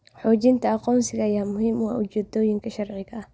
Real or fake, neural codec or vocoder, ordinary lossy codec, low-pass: real; none; none; none